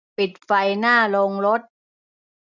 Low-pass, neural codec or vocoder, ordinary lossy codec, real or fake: 7.2 kHz; none; none; real